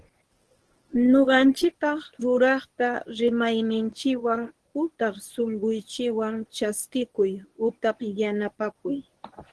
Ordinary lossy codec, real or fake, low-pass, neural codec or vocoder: Opus, 16 kbps; fake; 10.8 kHz; codec, 24 kHz, 0.9 kbps, WavTokenizer, medium speech release version 1